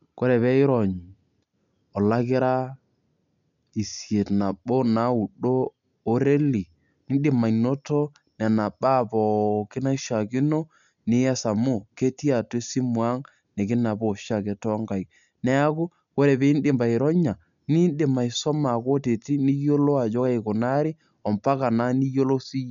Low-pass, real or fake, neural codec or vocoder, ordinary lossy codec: 7.2 kHz; real; none; none